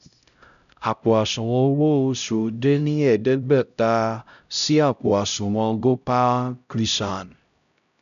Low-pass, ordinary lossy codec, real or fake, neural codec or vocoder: 7.2 kHz; none; fake; codec, 16 kHz, 0.5 kbps, X-Codec, HuBERT features, trained on LibriSpeech